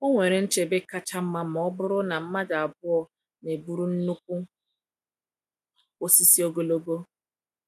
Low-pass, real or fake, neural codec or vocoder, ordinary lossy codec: 14.4 kHz; real; none; none